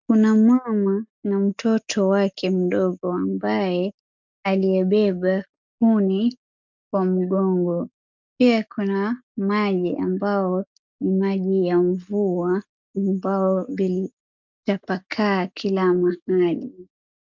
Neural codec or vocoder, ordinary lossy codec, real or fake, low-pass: none; MP3, 64 kbps; real; 7.2 kHz